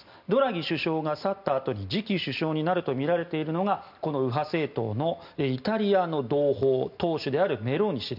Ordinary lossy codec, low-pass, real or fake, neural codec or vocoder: none; 5.4 kHz; real; none